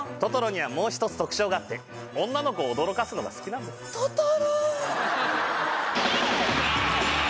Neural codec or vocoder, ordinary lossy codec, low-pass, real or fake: none; none; none; real